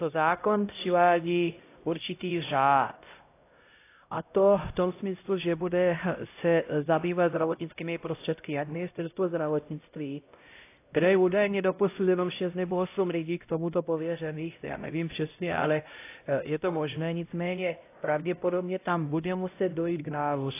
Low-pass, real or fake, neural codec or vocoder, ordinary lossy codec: 3.6 kHz; fake; codec, 16 kHz, 0.5 kbps, X-Codec, HuBERT features, trained on LibriSpeech; AAC, 24 kbps